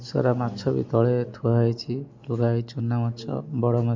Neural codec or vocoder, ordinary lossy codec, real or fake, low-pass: none; MP3, 64 kbps; real; 7.2 kHz